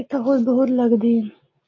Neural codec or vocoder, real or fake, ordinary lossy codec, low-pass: none; real; AAC, 32 kbps; 7.2 kHz